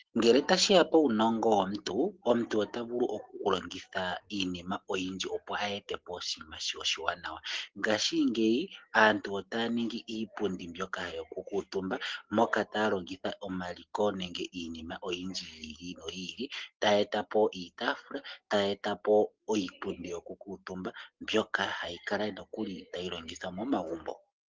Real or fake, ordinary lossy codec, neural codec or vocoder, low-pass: real; Opus, 16 kbps; none; 7.2 kHz